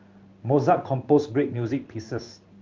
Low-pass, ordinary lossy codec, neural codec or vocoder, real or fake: 7.2 kHz; Opus, 24 kbps; codec, 16 kHz in and 24 kHz out, 1 kbps, XY-Tokenizer; fake